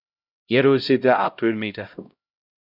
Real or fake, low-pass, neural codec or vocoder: fake; 5.4 kHz; codec, 16 kHz, 0.5 kbps, X-Codec, HuBERT features, trained on LibriSpeech